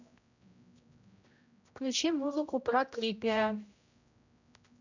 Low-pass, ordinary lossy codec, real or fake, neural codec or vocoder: 7.2 kHz; none; fake; codec, 16 kHz, 0.5 kbps, X-Codec, HuBERT features, trained on general audio